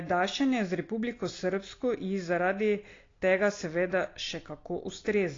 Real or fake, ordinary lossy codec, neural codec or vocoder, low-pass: real; AAC, 32 kbps; none; 7.2 kHz